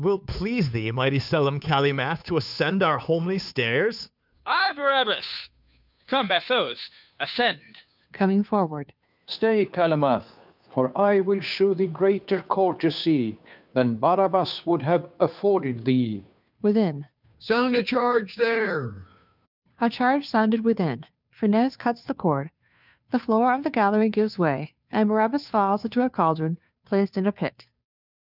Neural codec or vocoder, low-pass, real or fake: codec, 16 kHz, 2 kbps, FunCodec, trained on Chinese and English, 25 frames a second; 5.4 kHz; fake